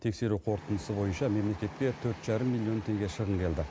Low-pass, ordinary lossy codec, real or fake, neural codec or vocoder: none; none; real; none